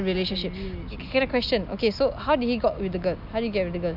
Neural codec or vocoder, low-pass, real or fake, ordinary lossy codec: none; 5.4 kHz; real; none